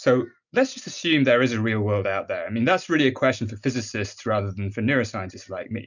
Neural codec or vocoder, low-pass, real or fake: none; 7.2 kHz; real